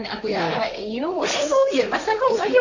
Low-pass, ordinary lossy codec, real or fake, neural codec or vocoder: 7.2 kHz; none; fake; codec, 16 kHz, 1.1 kbps, Voila-Tokenizer